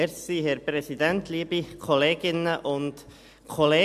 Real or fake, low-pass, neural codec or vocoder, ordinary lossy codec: real; 14.4 kHz; none; AAC, 96 kbps